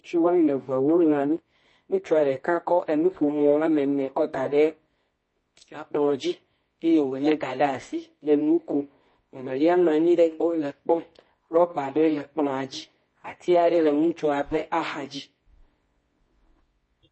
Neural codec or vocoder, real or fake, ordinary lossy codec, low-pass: codec, 24 kHz, 0.9 kbps, WavTokenizer, medium music audio release; fake; MP3, 32 kbps; 10.8 kHz